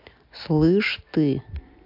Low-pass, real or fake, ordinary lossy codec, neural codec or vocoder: 5.4 kHz; real; MP3, 48 kbps; none